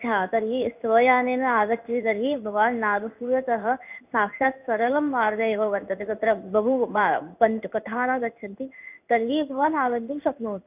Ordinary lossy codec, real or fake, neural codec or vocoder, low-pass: none; fake; codec, 16 kHz in and 24 kHz out, 1 kbps, XY-Tokenizer; 3.6 kHz